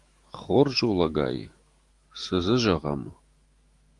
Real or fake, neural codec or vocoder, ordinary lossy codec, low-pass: fake; autoencoder, 48 kHz, 128 numbers a frame, DAC-VAE, trained on Japanese speech; Opus, 24 kbps; 10.8 kHz